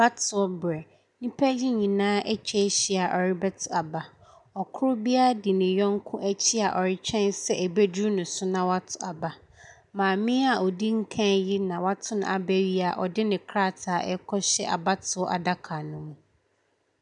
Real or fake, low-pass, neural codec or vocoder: real; 10.8 kHz; none